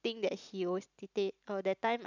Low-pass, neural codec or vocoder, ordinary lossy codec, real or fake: 7.2 kHz; none; none; real